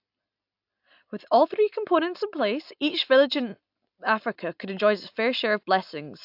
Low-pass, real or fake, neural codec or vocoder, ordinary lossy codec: 5.4 kHz; real; none; AAC, 48 kbps